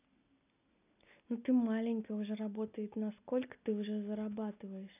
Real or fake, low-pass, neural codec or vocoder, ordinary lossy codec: real; 3.6 kHz; none; none